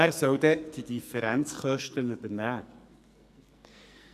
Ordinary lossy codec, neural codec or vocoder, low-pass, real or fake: none; codec, 44.1 kHz, 2.6 kbps, SNAC; 14.4 kHz; fake